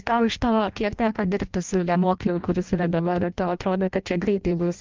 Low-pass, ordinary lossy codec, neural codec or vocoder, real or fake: 7.2 kHz; Opus, 32 kbps; codec, 16 kHz in and 24 kHz out, 0.6 kbps, FireRedTTS-2 codec; fake